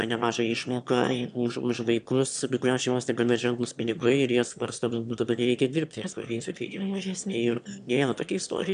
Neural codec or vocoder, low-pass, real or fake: autoencoder, 22.05 kHz, a latent of 192 numbers a frame, VITS, trained on one speaker; 9.9 kHz; fake